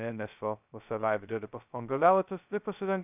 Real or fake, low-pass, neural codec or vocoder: fake; 3.6 kHz; codec, 16 kHz, 0.2 kbps, FocalCodec